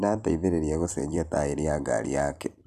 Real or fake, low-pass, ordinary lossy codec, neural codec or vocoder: fake; 14.4 kHz; none; vocoder, 44.1 kHz, 128 mel bands every 256 samples, BigVGAN v2